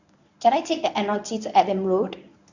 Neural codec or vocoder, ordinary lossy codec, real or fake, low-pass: codec, 24 kHz, 0.9 kbps, WavTokenizer, medium speech release version 1; none; fake; 7.2 kHz